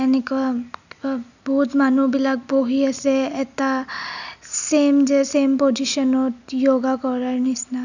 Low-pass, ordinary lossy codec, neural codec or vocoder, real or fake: 7.2 kHz; none; none; real